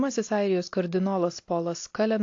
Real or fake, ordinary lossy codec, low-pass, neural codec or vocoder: real; AAC, 48 kbps; 7.2 kHz; none